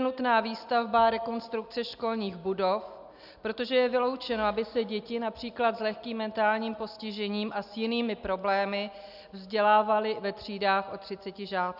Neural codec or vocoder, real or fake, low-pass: none; real; 5.4 kHz